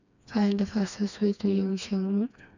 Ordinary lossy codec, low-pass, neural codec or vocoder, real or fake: none; 7.2 kHz; codec, 16 kHz, 2 kbps, FreqCodec, smaller model; fake